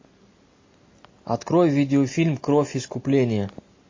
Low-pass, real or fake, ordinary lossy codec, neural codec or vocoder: 7.2 kHz; real; MP3, 32 kbps; none